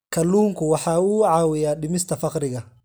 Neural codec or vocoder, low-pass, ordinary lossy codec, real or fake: none; none; none; real